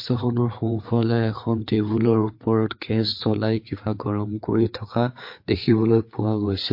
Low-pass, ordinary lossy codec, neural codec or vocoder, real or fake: 5.4 kHz; MP3, 32 kbps; codec, 16 kHz, 4 kbps, FreqCodec, larger model; fake